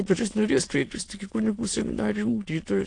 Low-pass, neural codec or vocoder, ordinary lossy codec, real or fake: 9.9 kHz; autoencoder, 22.05 kHz, a latent of 192 numbers a frame, VITS, trained on many speakers; AAC, 48 kbps; fake